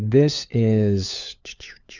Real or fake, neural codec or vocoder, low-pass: fake; codec, 16 kHz, 4 kbps, FunCodec, trained on LibriTTS, 50 frames a second; 7.2 kHz